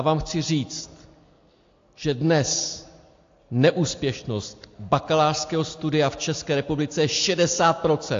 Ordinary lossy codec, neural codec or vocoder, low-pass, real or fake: AAC, 48 kbps; none; 7.2 kHz; real